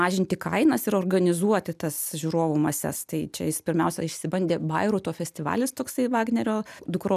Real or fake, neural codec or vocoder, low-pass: real; none; 14.4 kHz